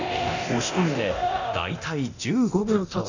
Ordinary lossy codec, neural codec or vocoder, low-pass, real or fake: none; codec, 24 kHz, 0.9 kbps, DualCodec; 7.2 kHz; fake